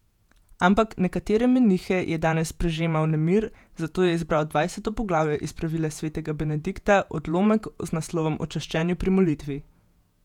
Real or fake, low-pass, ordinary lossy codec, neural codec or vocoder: fake; 19.8 kHz; none; vocoder, 48 kHz, 128 mel bands, Vocos